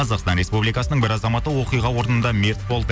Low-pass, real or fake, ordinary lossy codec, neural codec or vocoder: none; real; none; none